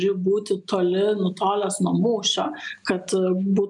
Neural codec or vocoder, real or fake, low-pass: none; real; 10.8 kHz